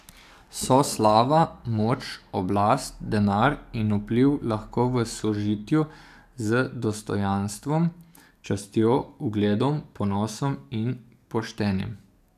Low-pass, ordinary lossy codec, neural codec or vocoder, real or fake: 14.4 kHz; none; codec, 44.1 kHz, 7.8 kbps, DAC; fake